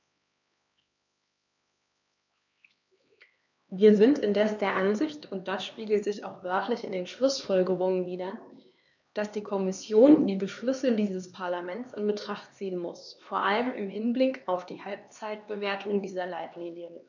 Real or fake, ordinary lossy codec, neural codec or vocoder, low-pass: fake; none; codec, 16 kHz, 2 kbps, X-Codec, HuBERT features, trained on LibriSpeech; 7.2 kHz